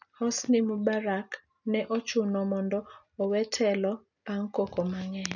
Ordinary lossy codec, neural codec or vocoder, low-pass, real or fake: none; none; 7.2 kHz; real